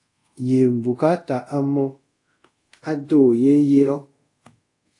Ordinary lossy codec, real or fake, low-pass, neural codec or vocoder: AAC, 48 kbps; fake; 10.8 kHz; codec, 24 kHz, 0.5 kbps, DualCodec